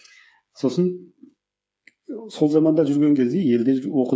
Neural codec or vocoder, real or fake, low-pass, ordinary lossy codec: codec, 16 kHz, 8 kbps, FreqCodec, smaller model; fake; none; none